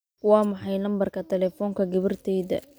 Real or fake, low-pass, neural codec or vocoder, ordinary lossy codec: real; none; none; none